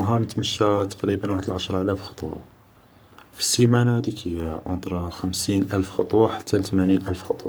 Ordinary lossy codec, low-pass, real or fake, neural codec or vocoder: none; none; fake; codec, 44.1 kHz, 3.4 kbps, Pupu-Codec